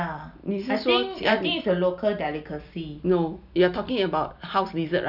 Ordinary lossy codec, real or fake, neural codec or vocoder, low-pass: none; real; none; 5.4 kHz